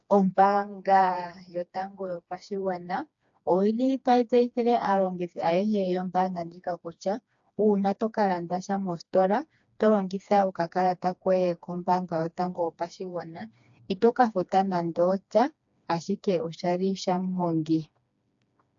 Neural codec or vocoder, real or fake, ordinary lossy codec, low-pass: codec, 16 kHz, 2 kbps, FreqCodec, smaller model; fake; AAC, 64 kbps; 7.2 kHz